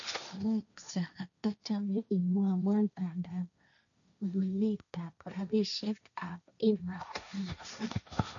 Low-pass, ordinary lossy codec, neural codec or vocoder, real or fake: 7.2 kHz; none; codec, 16 kHz, 1.1 kbps, Voila-Tokenizer; fake